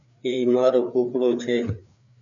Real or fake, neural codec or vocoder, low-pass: fake; codec, 16 kHz, 4 kbps, FreqCodec, larger model; 7.2 kHz